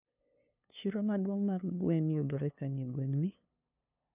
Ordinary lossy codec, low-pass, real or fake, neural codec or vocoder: none; 3.6 kHz; fake; codec, 16 kHz, 2 kbps, FunCodec, trained on LibriTTS, 25 frames a second